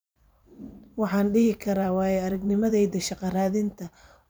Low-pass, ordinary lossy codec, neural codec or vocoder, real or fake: none; none; vocoder, 44.1 kHz, 128 mel bands every 512 samples, BigVGAN v2; fake